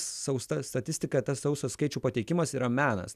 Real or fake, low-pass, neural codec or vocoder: real; 14.4 kHz; none